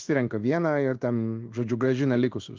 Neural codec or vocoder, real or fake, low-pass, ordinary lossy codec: codec, 16 kHz in and 24 kHz out, 1 kbps, XY-Tokenizer; fake; 7.2 kHz; Opus, 32 kbps